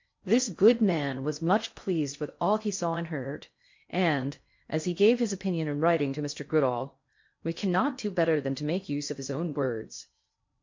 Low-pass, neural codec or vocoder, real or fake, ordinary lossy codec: 7.2 kHz; codec, 16 kHz in and 24 kHz out, 0.6 kbps, FocalCodec, streaming, 4096 codes; fake; MP3, 48 kbps